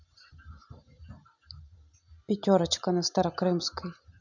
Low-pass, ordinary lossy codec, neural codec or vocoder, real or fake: 7.2 kHz; none; none; real